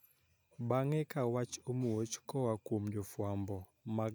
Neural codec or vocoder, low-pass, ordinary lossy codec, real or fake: none; none; none; real